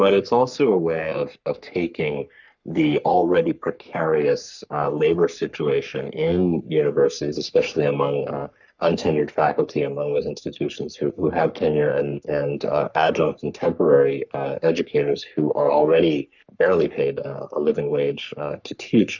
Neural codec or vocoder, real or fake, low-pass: codec, 44.1 kHz, 3.4 kbps, Pupu-Codec; fake; 7.2 kHz